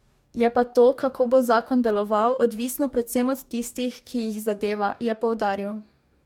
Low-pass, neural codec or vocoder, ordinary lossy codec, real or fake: 19.8 kHz; codec, 44.1 kHz, 2.6 kbps, DAC; MP3, 96 kbps; fake